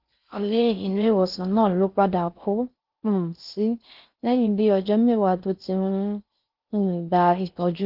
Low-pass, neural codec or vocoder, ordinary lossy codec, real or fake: 5.4 kHz; codec, 16 kHz in and 24 kHz out, 0.6 kbps, FocalCodec, streaming, 4096 codes; Opus, 24 kbps; fake